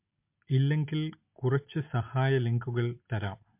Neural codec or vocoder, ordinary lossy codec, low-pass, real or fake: none; MP3, 32 kbps; 3.6 kHz; real